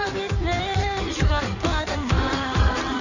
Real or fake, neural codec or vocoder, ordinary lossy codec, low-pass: fake; codec, 16 kHz in and 24 kHz out, 1.1 kbps, FireRedTTS-2 codec; none; 7.2 kHz